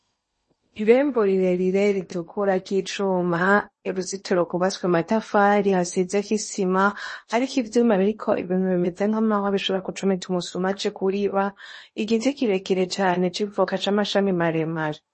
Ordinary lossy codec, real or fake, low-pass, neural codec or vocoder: MP3, 32 kbps; fake; 10.8 kHz; codec, 16 kHz in and 24 kHz out, 0.8 kbps, FocalCodec, streaming, 65536 codes